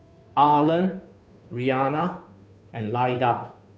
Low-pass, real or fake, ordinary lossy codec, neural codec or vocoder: none; fake; none; codec, 16 kHz, 2 kbps, FunCodec, trained on Chinese and English, 25 frames a second